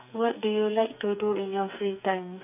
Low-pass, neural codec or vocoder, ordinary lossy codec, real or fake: 3.6 kHz; codec, 44.1 kHz, 2.6 kbps, SNAC; none; fake